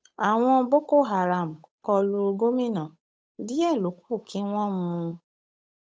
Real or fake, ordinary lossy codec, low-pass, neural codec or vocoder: fake; none; none; codec, 16 kHz, 8 kbps, FunCodec, trained on Chinese and English, 25 frames a second